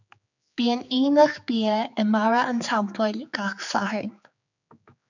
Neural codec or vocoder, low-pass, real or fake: codec, 16 kHz, 4 kbps, X-Codec, HuBERT features, trained on general audio; 7.2 kHz; fake